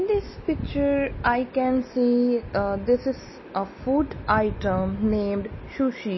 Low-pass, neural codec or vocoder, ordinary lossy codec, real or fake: 7.2 kHz; none; MP3, 24 kbps; real